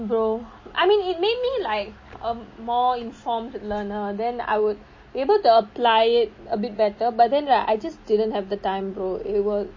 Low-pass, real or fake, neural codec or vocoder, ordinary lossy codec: 7.2 kHz; real; none; MP3, 32 kbps